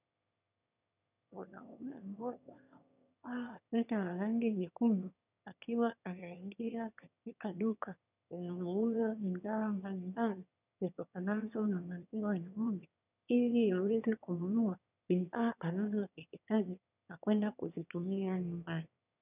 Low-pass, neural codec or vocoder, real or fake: 3.6 kHz; autoencoder, 22.05 kHz, a latent of 192 numbers a frame, VITS, trained on one speaker; fake